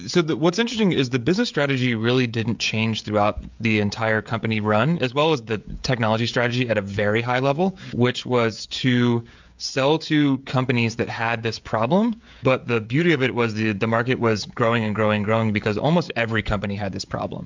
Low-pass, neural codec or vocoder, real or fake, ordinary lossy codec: 7.2 kHz; codec, 16 kHz, 16 kbps, FreqCodec, smaller model; fake; MP3, 64 kbps